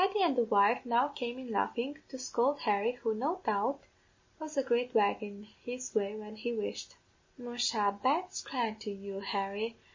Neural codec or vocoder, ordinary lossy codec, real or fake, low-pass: none; MP3, 32 kbps; real; 7.2 kHz